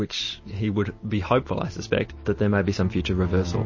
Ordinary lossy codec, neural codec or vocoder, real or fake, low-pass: MP3, 32 kbps; none; real; 7.2 kHz